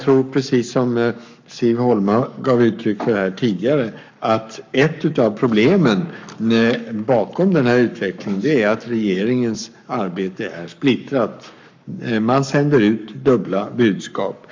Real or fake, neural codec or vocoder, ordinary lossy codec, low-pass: fake; codec, 44.1 kHz, 7.8 kbps, Pupu-Codec; MP3, 64 kbps; 7.2 kHz